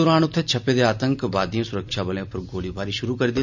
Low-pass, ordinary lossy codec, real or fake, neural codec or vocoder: 7.2 kHz; none; real; none